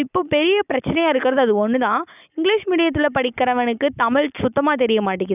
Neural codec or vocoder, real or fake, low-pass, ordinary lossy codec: none; real; 3.6 kHz; none